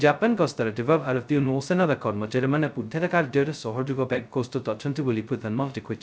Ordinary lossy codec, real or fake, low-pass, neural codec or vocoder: none; fake; none; codec, 16 kHz, 0.2 kbps, FocalCodec